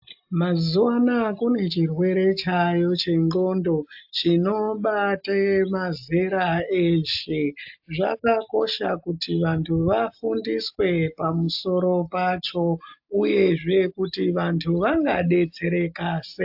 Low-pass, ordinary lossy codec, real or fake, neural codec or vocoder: 5.4 kHz; AAC, 48 kbps; real; none